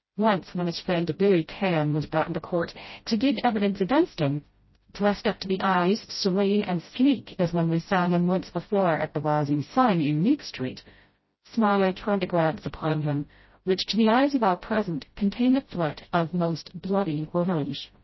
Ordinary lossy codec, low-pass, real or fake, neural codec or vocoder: MP3, 24 kbps; 7.2 kHz; fake; codec, 16 kHz, 0.5 kbps, FreqCodec, smaller model